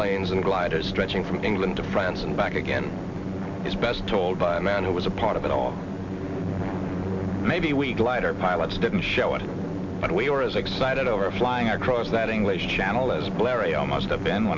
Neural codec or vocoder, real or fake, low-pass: none; real; 7.2 kHz